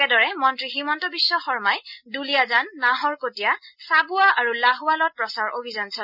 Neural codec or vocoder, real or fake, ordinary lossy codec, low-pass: none; real; none; 5.4 kHz